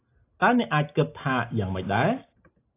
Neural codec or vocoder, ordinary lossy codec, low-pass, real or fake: none; AAC, 24 kbps; 3.6 kHz; real